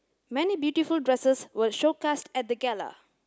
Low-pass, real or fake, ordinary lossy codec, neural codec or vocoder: none; real; none; none